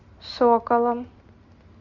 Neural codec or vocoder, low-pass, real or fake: none; 7.2 kHz; real